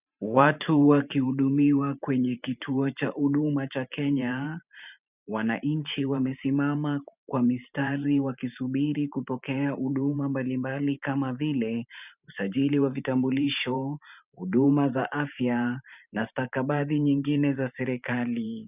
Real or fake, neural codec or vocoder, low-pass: fake; vocoder, 44.1 kHz, 128 mel bands every 512 samples, BigVGAN v2; 3.6 kHz